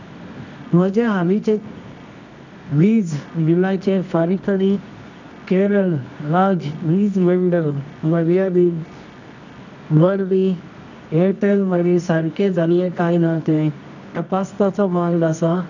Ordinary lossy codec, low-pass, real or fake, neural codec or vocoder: none; 7.2 kHz; fake; codec, 24 kHz, 0.9 kbps, WavTokenizer, medium music audio release